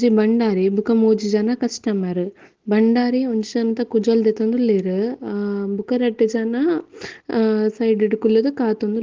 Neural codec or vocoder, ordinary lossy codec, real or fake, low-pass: none; Opus, 16 kbps; real; 7.2 kHz